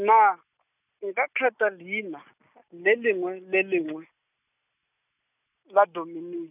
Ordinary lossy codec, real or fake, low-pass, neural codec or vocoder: none; real; 3.6 kHz; none